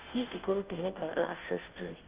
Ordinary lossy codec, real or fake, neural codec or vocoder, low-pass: Opus, 32 kbps; fake; codec, 16 kHz, 0.5 kbps, FunCodec, trained on Chinese and English, 25 frames a second; 3.6 kHz